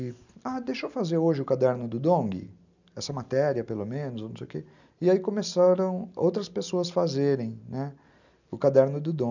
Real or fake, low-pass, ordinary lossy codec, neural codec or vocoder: real; 7.2 kHz; none; none